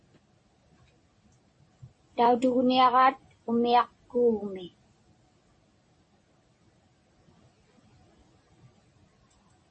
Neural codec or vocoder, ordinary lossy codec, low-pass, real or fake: none; MP3, 32 kbps; 10.8 kHz; real